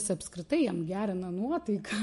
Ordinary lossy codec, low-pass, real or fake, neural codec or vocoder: MP3, 48 kbps; 14.4 kHz; real; none